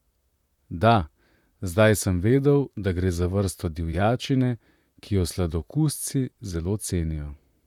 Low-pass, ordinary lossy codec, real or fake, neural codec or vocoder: 19.8 kHz; none; fake; vocoder, 44.1 kHz, 128 mel bands, Pupu-Vocoder